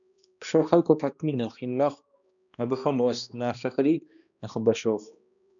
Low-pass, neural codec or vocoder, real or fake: 7.2 kHz; codec, 16 kHz, 1 kbps, X-Codec, HuBERT features, trained on balanced general audio; fake